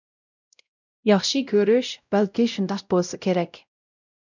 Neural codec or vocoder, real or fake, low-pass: codec, 16 kHz, 0.5 kbps, X-Codec, WavLM features, trained on Multilingual LibriSpeech; fake; 7.2 kHz